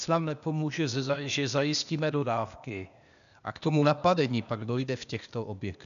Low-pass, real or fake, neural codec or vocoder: 7.2 kHz; fake; codec, 16 kHz, 0.8 kbps, ZipCodec